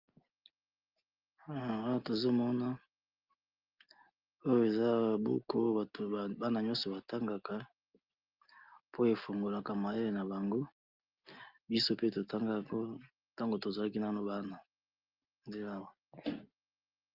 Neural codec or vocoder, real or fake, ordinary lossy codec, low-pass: none; real; Opus, 32 kbps; 5.4 kHz